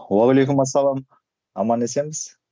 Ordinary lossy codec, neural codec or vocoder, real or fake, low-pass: none; none; real; none